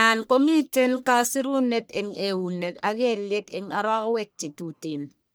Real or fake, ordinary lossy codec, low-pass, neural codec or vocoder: fake; none; none; codec, 44.1 kHz, 1.7 kbps, Pupu-Codec